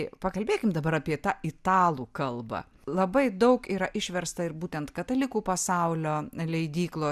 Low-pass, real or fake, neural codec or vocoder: 14.4 kHz; real; none